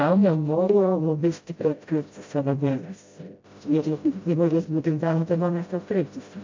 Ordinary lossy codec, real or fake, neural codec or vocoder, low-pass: MP3, 48 kbps; fake; codec, 16 kHz, 0.5 kbps, FreqCodec, smaller model; 7.2 kHz